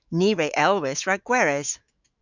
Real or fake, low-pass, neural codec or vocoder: fake; 7.2 kHz; autoencoder, 48 kHz, 128 numbers a frame, DAC-VAE, trained on Japanese speech